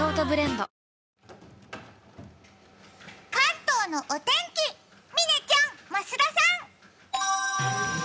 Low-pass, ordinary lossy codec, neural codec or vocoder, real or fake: none; none; none; real